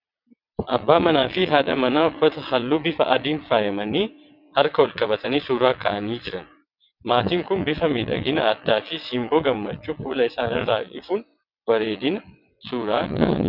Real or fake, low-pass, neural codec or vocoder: fake; 5.4 kHz; vocoder, 22.05 kHz, 80 mel bands, WaveNeXt